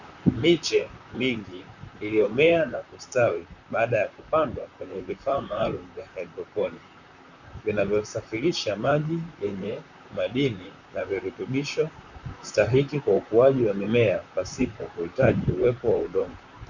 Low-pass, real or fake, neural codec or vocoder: 7.2 kHz; fake; vocoder, 44.1 kHz, 128 mel bands, Pupu-Vocoder